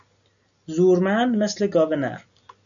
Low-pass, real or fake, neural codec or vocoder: 7.2 kHz; real; none